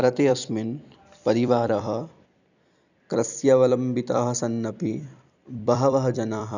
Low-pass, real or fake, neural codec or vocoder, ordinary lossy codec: 7.2 kHz; fake; vocoder, 44.1 kHz, 128 mel bands every 256 samples, BigVGAN v2; none